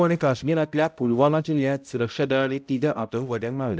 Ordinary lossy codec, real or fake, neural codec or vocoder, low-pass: none; fake; codec, 16 kHz, 0.5 kbps, X-Codec, HuBERT features, trained on balanced general audio; none